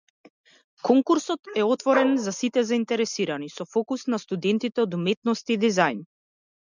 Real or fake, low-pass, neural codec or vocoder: real; 7.2 kHz; none